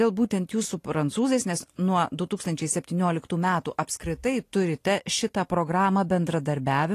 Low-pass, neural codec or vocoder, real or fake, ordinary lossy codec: 14.4 kHz; none; real; AAC, 48 kbps